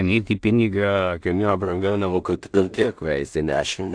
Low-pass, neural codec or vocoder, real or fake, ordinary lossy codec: 9.9 kHz; codec, 16 kHz in and 24 kHz out, 0.4 kbps, LongCat-Audio-Codec, two codebook decoder; fake; AAC, 64 kbps